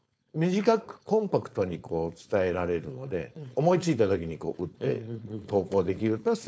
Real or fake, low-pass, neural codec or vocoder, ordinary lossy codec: fake; none; codec, 16 kHz, 4.8 kbps, FACodec; none